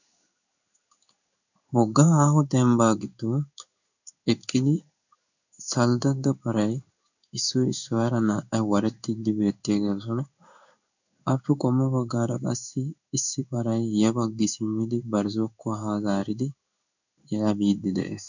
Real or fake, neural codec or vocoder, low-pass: fake; codec, 16 kHz in and 24 kHz out, 1 kbps, XY-Tokenizer; 7.2 kHz